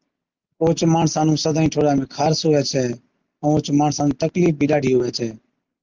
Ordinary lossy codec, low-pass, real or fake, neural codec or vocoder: Opus, 32 kbps; 7.2 kHz; real; none